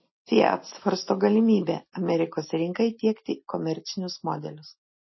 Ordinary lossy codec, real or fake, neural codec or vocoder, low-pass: MP3, 24 kbps; real; none; 7.2 kHz